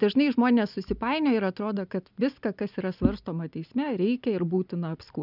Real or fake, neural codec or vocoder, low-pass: real; none; 5.4 kHz